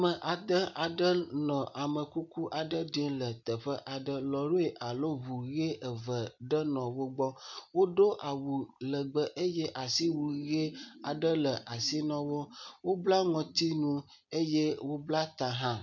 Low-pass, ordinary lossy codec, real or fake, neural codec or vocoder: 7.2 kHz; MP3, 64 kbps; real; none